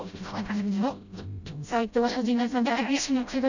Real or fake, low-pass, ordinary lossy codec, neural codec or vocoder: fake; 7.2 kHz; none; codec, 16 kHz, 0.5 kbps, FreqCodec, smaller model